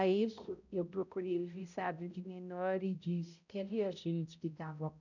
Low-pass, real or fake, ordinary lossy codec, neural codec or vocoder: 7.2 kHz; fake; none; codec, 16 kHz, 0.5 kbps, X-Codec, HuBERT features, trained on balanced general audio